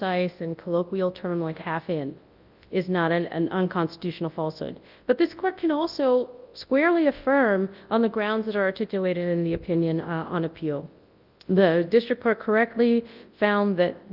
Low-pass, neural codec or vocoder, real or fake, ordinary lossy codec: 5.4 kHz; codec, 24 kHz, 0.9 kbps, WavTokenizer, large speech release; fake; Opus, 24 kbps